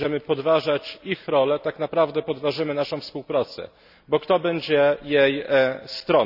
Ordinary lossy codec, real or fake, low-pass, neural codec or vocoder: none; real; 5.4 kHz; none